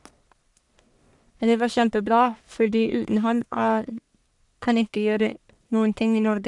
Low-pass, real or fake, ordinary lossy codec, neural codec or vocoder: 10.8 kHz; fake; none; codec, 44.1 kHz, 1.7 kbps, Pupu-Codec